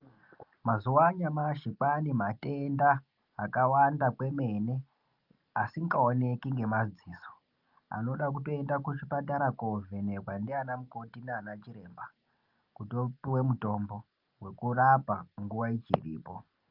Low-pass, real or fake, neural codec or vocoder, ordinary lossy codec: 5.4 kHz; real; none; Opus, 24 kbps